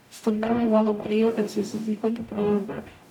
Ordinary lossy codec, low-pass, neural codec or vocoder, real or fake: none; 19.8 kHz; codec, 44.1 kHz, 0.9 kbps, DAC; fake